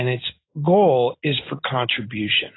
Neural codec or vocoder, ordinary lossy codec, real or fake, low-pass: none; AAC, 16 kbps; real; 7.2 kHz